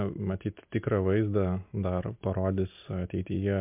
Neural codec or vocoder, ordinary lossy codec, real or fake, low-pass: none; MP3, 32 kbps; real; 3.6 kHz